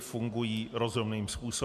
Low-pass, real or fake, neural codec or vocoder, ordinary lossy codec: 14.4 kHz; real; none; MP3, 96 kbps